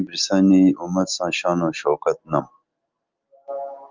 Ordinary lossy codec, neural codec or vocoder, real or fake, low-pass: Opus, 24 kbps; none; real; 7.2 kHz